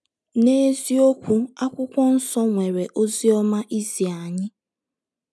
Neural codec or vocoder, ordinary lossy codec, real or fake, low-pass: none; none; real; none